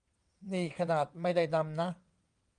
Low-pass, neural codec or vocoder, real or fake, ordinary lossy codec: 9.9 kHz; vocoder, 22.05 kHz, 80 mel bands, WaveNeXt; fake; Opus, 32 kbps